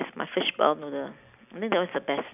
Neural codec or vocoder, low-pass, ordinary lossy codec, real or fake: none; 3.6 kHz; none; real